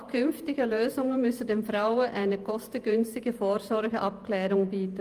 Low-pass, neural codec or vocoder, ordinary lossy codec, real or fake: 14.4 kHz; vocoder, 48 kHz, 128 mel bands, Vocos; Opus, 32 kbps; fake